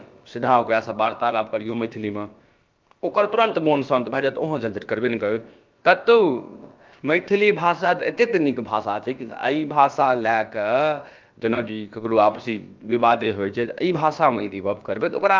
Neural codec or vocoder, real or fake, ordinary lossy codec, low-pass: codec, 16 kHz, about 1 kbps, DyCAST, with the encoder's durations; fake; Opus, 24 kbps; 7.2 kHz